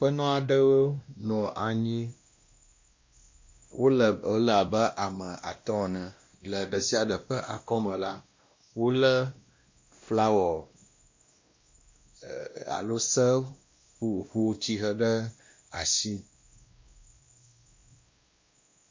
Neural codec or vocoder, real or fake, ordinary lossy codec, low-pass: codec, 16 kHz, 1 kbps, X-Codec, WavLM features, trained on Multilingual LibriSpeech; fake; MP3, 48 kbps; 7.2 kHz